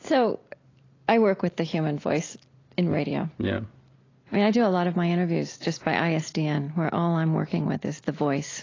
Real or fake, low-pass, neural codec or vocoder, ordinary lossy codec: real; 7.2 kHz; none; AAC, 32 kbps